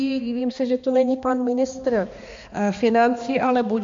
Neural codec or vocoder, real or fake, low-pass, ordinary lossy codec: codec, 16 kHz, 2 kbps, X-Codec, HuBERT features, trained on balanced general audio; fake; 7.2 kHz; MP3, 48 kbps